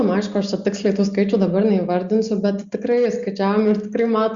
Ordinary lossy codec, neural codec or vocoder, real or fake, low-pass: Opus, 64 kbps; none; real; 7.2 kHz